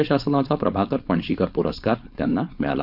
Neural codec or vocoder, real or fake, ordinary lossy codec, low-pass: codec, 16 kHz, 4.8 kbps, FACodec; fake; none; 5.4 kHz